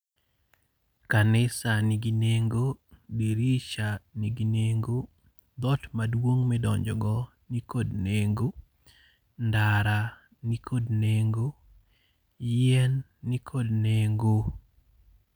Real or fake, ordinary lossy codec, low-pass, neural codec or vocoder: real; none; none; none